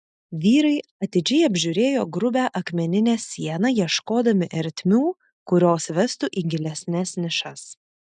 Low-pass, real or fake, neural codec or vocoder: 9.9 kHz; real; none